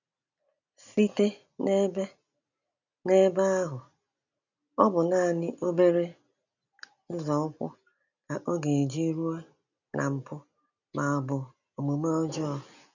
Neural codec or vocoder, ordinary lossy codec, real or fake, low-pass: none; none; real; 7.2 kHz